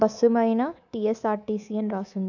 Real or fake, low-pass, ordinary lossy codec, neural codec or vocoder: fake; 7.2 kHz; none; codec, 24 kHz, 3.1 kbps, DualCodec